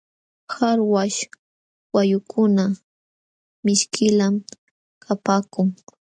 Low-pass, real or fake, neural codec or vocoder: 9.9 kHz; real; none